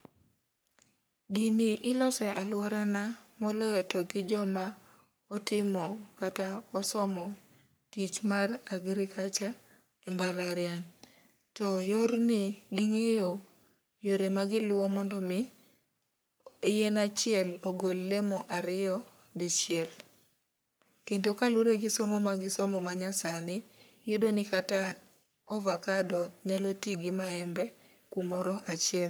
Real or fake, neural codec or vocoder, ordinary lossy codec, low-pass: fake; codec, 44.1 kHz, 3.4 kbps, Pupu-Codec; none; none